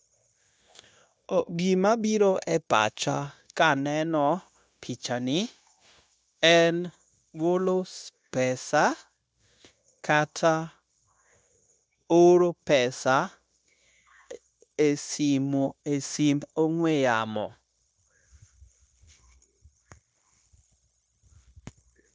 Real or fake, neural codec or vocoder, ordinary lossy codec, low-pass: fake; codec, 16 kHz, 0.9 kbps, LongCat-Audio-Codec; none; none